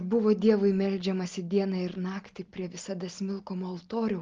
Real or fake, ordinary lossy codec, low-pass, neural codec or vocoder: real; Opus, 32 kbps; 7.2 kHz; none